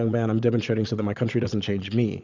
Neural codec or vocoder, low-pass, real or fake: vocoder, 22.05 kHz, 80 mel bands, Vocos; 7.2 kHz; fake